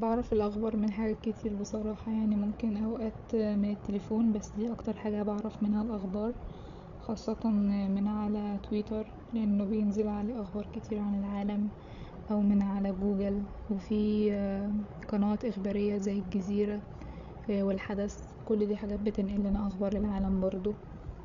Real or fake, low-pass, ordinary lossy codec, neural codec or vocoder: fake; 7.2 kHz; none; codec, 16 kHz, 8 kbps, FreqCodec, larger model